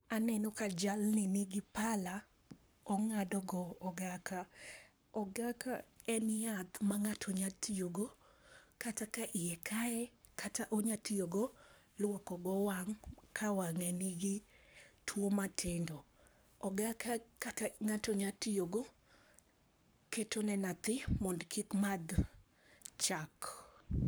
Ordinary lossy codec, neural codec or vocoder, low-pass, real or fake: none; codec, 44.1 kHz, 7.8 kbps, Pupu-Codec; none; fake